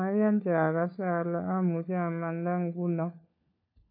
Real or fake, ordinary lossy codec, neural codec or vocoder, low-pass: fake; AAC, 32 kbps; codec, 16 kHz, 4 kbps, FunCodec, trained on LibriTTS, 50 frames a second; 5.4 kHz